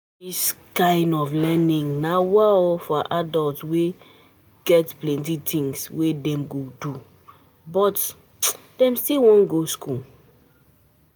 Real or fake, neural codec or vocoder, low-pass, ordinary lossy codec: real; none; none; none